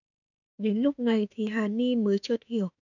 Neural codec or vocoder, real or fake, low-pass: autoencoder, 48 kHz, 32 numbers a frame, DAC-VAE, trained on Japanese speech; fake; 7.2 kHz